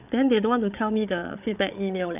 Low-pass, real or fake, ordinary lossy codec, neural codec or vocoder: 3.6 kHz; fake; none; codec, 16 kHz, 8 kbps, FreqCodec, larger model